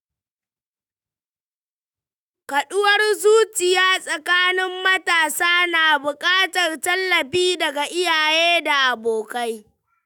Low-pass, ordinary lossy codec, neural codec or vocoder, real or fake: none; none; autoencoder, 48 kHz, 128 numbers a frame, DAC-VAE, trained on Japanese speech; fake